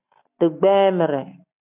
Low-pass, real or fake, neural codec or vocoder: 3.6 kHz; real; none